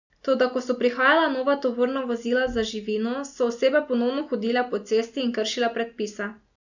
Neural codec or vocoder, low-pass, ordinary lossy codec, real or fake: none; 7.2 kHz; none; real